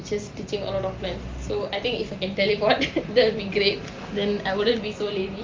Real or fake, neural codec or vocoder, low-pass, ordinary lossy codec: real; none; 7.2 kHz; Opus, 24 kbps